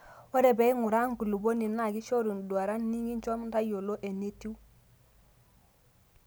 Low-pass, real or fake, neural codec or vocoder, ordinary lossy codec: none; real; none; none